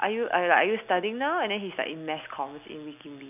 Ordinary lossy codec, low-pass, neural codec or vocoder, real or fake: none; 3.6 kHz; none; real